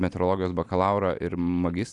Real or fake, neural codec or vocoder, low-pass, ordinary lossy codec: real; none; 10.8 kHz; AAC, 64 kbps